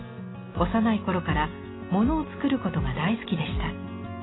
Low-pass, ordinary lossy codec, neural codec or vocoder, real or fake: 7.2 kHz; AAC, 16 kbps; none; real